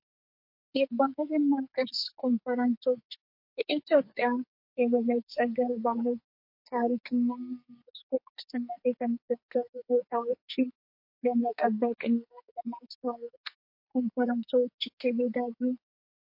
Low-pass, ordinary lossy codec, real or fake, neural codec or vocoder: 5.4 kHz; MP3, 32 kbps; fake; codec, 44.1 kHz, 2.6 kbps, SNAC